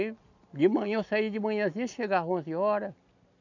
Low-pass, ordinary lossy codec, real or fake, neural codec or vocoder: 7.2 kHz; none; real; none